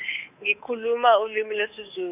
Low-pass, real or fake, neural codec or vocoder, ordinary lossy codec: 3.6 kHz; fake; codec, 24 kHz, 3.1 kbps, DualCodec; none